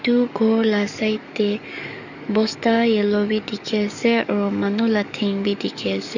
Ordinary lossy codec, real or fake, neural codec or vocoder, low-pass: none; fake; codec, 44.1 kHz, 7.8 kbps, DAC; 7.2 kHz